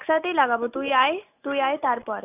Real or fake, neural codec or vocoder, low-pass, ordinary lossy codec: real; none; 3.6 kHz; none